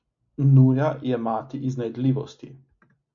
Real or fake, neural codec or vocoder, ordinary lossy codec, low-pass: real; none; MP3, 48 kbps; 7.2 kHz